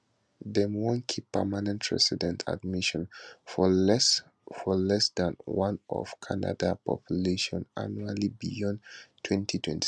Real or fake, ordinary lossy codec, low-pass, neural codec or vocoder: real; none; none; none